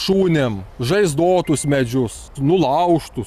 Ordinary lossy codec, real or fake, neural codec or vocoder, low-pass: Opus, 64 kbps; real; none; 14.4 kHz